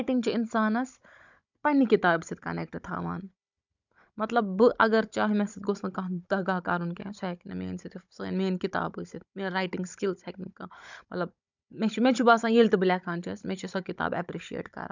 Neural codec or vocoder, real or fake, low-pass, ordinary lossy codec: codec, 16 kHz, 16 kbps, FunCodec, trained on Chinese and English, 50 frames a second; fake; 7.2 kHz; none